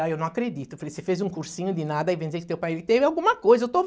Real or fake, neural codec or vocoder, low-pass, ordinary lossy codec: real; none; none; none